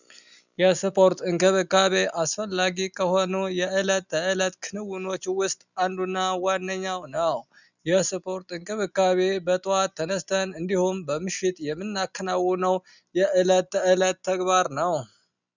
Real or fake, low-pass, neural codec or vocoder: real; 7.2 kHz; none